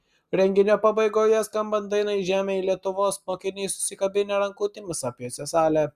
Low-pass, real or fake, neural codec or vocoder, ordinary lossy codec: 14.4 kHz; real; none; Opus, 64 kbps